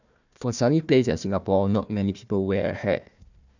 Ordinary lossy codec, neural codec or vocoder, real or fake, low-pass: none; codec, 16 kHz, 1 kbps, FunCodec, trained on Chinese and English, 50 frames a second; fake; 7.2 kHz